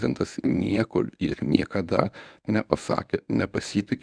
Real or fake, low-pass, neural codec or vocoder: fake; 9.9 kHz; codec, 24 kHz, 0.9 kbps, WavTokenizer, medium speech release version 1